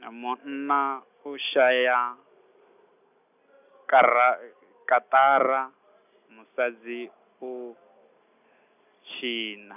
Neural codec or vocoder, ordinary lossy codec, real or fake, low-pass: none; none; real; 3.6 kHz